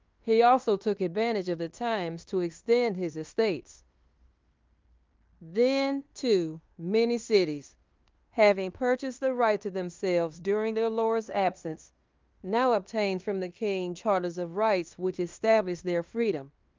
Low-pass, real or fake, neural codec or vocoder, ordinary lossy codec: 7.2 kHz; fake; codec, 16 kHz in and 24 kHz out, 0.9 kbps, LongCat-Audio-Codec, fine tuned four codebook decoder; Opus, 32 kbps